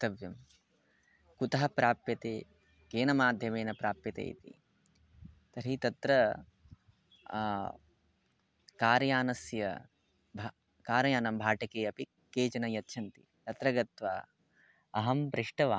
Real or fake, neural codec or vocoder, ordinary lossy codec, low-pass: real; none; none; none